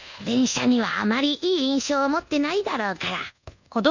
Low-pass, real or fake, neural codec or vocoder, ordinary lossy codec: 7.2 kHz; fake; codec, 24 kHz, 1.2 kbps, DualCodec; none